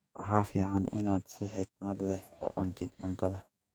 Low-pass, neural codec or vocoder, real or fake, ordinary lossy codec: none; codec, 44.1 kHz, 2.6 kbps, DAC; fake; none